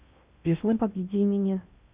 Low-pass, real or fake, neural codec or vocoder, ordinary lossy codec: 3.6 kHz; fake; codec, 16 kHz in and 24 kHz out, 0.6 kbps, FocalCodec, streaming, 2048 codes; Opus, 64 kbps